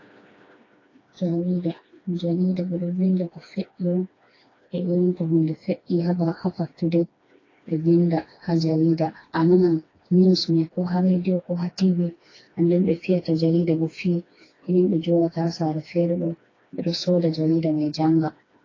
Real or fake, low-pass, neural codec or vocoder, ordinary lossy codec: fake; 7.2 kHz; codec, 16 kHz, 2 kbps, FreqCodec, smaller model; AAC, 32 kbps